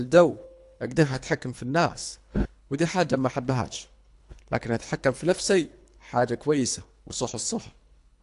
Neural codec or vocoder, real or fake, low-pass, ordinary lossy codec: codec, 24 kHz, 3 kbps, HILCodec; fake; 10.8 kHz; AAC, 64 kbps